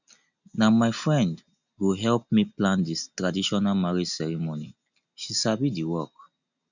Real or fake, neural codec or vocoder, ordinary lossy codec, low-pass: real; none; none; 7.2 kHz